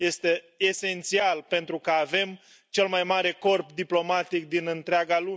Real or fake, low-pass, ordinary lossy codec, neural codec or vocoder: real; none; none; none